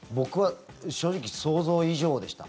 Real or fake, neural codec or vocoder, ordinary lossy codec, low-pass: real; none; none; none